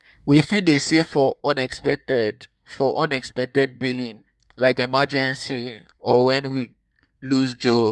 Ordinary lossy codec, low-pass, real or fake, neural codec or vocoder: none; none; fake; codec, 24 kHz, 1 kbps, SNAC